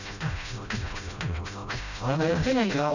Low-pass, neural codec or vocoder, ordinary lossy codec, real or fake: 7.2 kHz; codec, 16 kHz, 0.5 kbps, FreqCodec, smaller model; none; fake